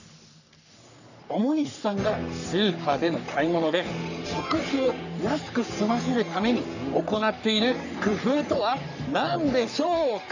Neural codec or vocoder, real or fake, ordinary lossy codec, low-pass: codec, 44.1 kHz, 3.4 kbps, Pupu-Codec; fake; none; 7.2 kHz